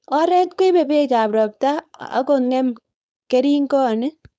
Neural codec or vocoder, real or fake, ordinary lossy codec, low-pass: codec, 16 kHz, 4.8 kbps, FACodec; fake; none; none